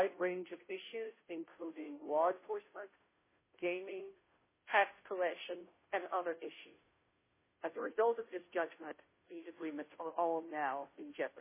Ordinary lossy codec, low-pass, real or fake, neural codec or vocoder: MP3, 16 kbps; 3.6 kHz; fake; codec, 16 kHz, 0.5 kbps, FunCodec, trained on Chinese and English, 25 frames a second